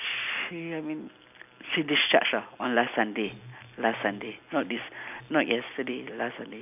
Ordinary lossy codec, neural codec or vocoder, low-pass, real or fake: none; none; 3.6 kHz; real